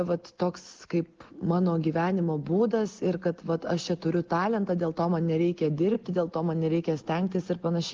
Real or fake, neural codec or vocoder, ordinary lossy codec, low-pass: real; none; Opus, 16 kbps; 7.2 kHz